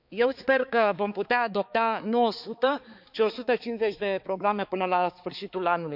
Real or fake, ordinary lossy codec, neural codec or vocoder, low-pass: fake; none; codec, 16 kHz, 4 kbps, X-Codec, HuBERT features, trained on balanced general audio; 5.4 kHz